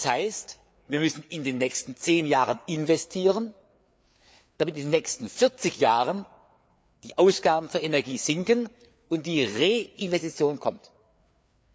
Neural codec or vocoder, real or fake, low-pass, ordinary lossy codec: codec, 16 kHz, 4 kbps, FreqCodec, larger model; fake; none; none